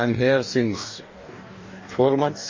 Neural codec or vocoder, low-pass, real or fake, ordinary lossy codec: codec, 44.1 kHz, 2.6 kbps, DAC; 7.2 kHz; fake; MP3, 32 kbps